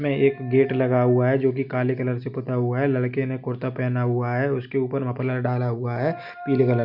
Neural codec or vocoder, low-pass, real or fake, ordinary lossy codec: none; 5.4 kHz; real; none